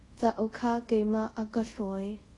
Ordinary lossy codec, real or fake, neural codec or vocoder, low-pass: AAC, 32 kbps; fake; codec, 24 kHz, 0.5 kbps, DualCodec; 10.8 kHz